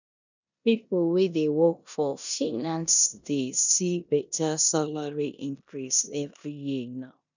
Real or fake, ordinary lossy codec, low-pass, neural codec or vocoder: fake; none; 7.2 kHz; codec, 16 kHz in and 24 kHz out, 0.9 kbps, LongCat-Audio-Codec, four codebook decoder